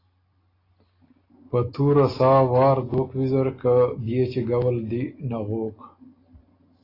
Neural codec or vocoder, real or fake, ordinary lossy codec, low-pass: none; real; AAC, 24 kbps; 5.4 kHz